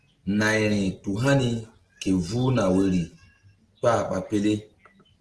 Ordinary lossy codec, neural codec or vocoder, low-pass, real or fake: Opus, 16 kbps; none; 10.8 kHz; real